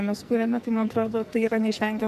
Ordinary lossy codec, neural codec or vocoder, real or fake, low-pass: MP3, 96 kbps; codec, 44.1 kHz, 2.6 kbps, SNAC; fake; 14.4 kHz